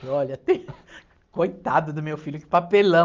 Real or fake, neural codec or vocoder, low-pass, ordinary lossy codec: real; none; 7.2 kHz; Opus, 24 kbps